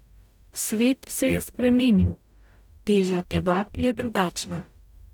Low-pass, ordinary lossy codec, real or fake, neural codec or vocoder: 19.8 kHz; none; fake; codec, 44.1 kHz, 0.9 kbps, DAC